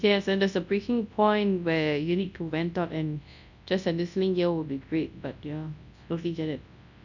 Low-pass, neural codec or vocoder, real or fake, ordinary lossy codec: 7.2 kHz; codec, 24 kHz, 0.9 kbps, WavTokenizer, large speech release; fake; none